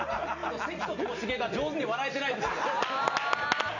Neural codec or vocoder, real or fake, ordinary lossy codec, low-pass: none; real; none; 7.2 kHz